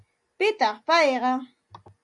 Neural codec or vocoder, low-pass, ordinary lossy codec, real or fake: none; 10.8 kHz; AAC, 64 kbps; real